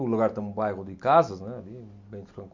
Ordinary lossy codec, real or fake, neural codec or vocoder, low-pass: MP3, 48 kbps; real; none; 7.2 kHz